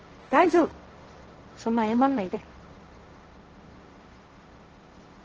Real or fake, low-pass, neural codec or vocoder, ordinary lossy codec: fake; 7.2 kHz; codec, 16 kHz, 1.1 kbps, Voila-Tokenizer; Opus, 16 kbps